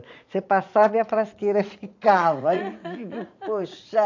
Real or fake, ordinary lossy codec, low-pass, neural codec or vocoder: fake; AAC, 48 kbps; 7.2 kHz; vocoder, 44.1 kHz, 80 mel bands, Vocos